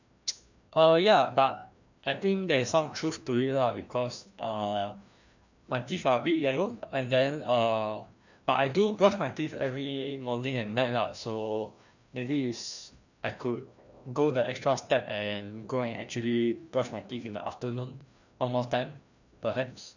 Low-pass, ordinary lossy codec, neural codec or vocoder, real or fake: 7.2 kHz; none; codec, 16 kHz, 1 kbps, FreqCodec, larger model; fake